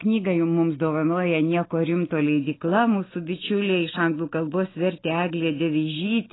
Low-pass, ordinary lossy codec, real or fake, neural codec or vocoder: 7.2 kHz; AAC, 16 kbps; real; none